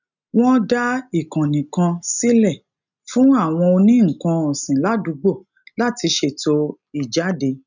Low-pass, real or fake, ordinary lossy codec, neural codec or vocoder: 7.2 kHz; real; none; none